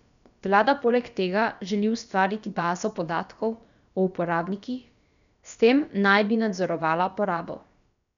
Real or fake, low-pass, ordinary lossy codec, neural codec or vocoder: fake; 7.2 kHz; none; codec, 16 kHz, about 1 kbps, DyCAST, with the encoder's durations